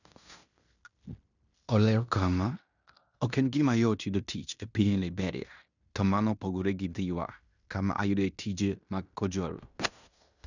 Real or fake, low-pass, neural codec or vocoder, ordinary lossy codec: fake; 7.2 kHz; codec, 16 kHz in and 24 kHz out, 0.9 kbps, LongCat-Audio-Codec, fine tuned four codebook decoder; none